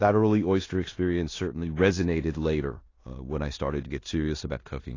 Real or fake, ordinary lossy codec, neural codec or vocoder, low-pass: fake; AAC, 32 kbps; codec, 16 kHz in and 24 kHz out, 0.9 kbps, LongCat-Audio-Codec, four codebook decoder; 7.2 kHz